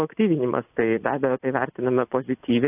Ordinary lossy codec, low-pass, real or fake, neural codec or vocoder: AAC, 32 kbps; 3.6 kHz; real; none